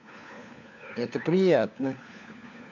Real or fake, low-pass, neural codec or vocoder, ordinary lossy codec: fake; 7.2 kHz; codec, 16 kHz, 4 kbps, FunCodec, trained on LibriTTS, 50 frames a second; none